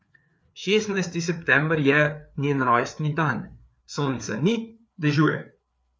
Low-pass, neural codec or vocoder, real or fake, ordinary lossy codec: none; codec, 16 kHz, 4 kbps, FreqCodec, larger model; fake; none